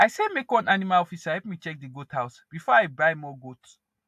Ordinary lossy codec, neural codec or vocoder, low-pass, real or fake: AAC, 96 kbps; none; 14.4 kHz; real